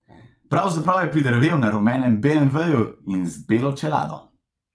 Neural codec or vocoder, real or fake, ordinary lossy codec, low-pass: vocoder, 22.05 kHz, 80 mel bands, WaveNeXt; fake; none; none